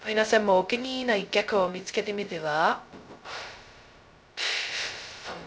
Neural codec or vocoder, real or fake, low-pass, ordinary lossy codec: codec, 16 kHz, 0.2 kbps, FocalCodec; fake; none; none